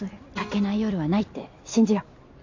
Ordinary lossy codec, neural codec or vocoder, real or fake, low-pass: AAC, 48 kbps; none; real; 7.2 kHz